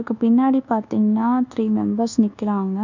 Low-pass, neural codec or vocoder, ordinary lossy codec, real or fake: 7.2 kHz; codec, 24 kHz, 1.2 kbps, DualCodec; none; fake